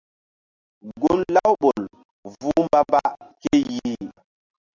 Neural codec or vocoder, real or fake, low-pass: none; real; 7.2 kHz